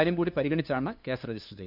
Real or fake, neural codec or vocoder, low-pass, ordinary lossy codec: fake; codec, 16 kHz, 2 kbps, FunCodec, trained on LibriTTS, 25 frames a second; 5.4 kHz; none